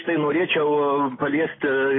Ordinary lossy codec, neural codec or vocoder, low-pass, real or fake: AAC, 16 kbps; none; 7.2 kHz; real